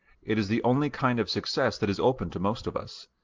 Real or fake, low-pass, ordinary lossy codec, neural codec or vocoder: real; 7.2 kHz; Opus, 24 kbps; none